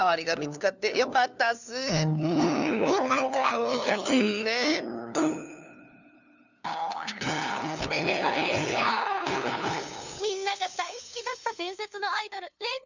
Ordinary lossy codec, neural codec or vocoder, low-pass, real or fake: none; codec, 16 kHz, 2 kbps, FunCodec, trained on LibriTTS, 25 frames a second; 7.2 kHz; fake